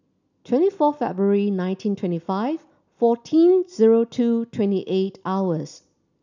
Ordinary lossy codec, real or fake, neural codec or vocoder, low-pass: none; real; none; 7.2 kHz